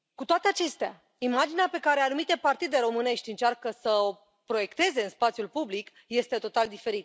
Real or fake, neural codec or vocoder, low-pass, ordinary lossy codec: real; none; none; none